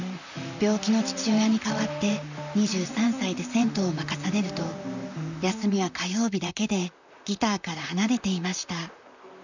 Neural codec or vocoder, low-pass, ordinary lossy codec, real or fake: vocoder, 44.1 kHz, 128 mel bands, Pupu-Vocoder; 7.2 kHz; none; fake